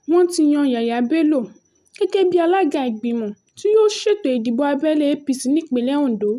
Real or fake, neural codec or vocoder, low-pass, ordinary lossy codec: real; none; 14.4 kHz; none